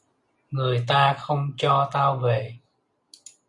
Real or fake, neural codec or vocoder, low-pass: real; none; 10.8 kHz